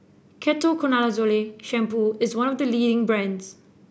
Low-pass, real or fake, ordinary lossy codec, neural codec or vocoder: none; real; none; none